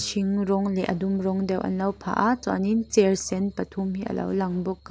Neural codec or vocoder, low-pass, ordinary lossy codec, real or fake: none; none; none; real